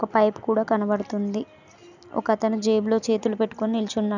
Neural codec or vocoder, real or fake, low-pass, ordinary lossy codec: none; real; 7.2 kHz; none